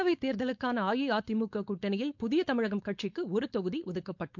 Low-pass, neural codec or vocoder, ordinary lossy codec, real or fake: 7.2 kHz; codec, 16 kHz, 4.8 kbps, FACodec; MP3, 64 kbps; fake